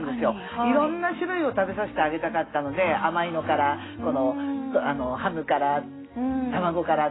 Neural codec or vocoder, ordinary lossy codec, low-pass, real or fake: none; AAC, 16 kbps; 7.2 kHz; real